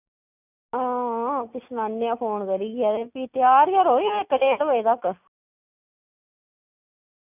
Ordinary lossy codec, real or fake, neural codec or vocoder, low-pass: none; real; none; 3.6 kHz